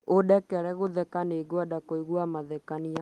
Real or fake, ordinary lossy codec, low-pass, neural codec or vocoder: real; Opus, 24 kbps; 19.8 kHz; none